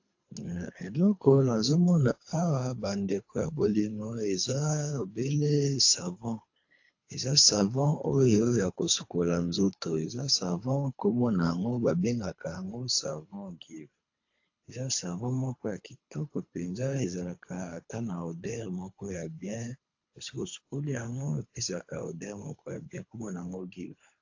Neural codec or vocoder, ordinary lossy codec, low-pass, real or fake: codec, 24 kHz, 3 kbps, HILCodec; AAC, 48 kbps; 7.2 kHz; fake